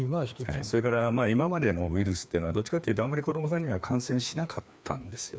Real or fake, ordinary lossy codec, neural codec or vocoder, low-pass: fake; none; codec, 16 kHz, 2 kbps, FreqCodec, larger model; none